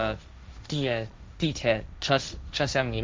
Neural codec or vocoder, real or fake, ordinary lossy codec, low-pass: codec, 16 kHz, 1.1 kbps, Voila-Tokenizer; fake; none; none